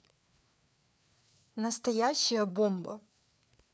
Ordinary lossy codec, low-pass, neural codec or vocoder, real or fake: none; none; codec, 16 kHz, 4 kbps, FreqCodec, larger model; fake